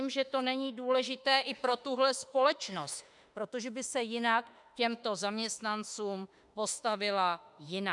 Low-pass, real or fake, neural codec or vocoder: 10.8 kHz; fake; autoencoder, 48 kHz, 32 numbers a frame, DAC-VAE, trained on Japanese speech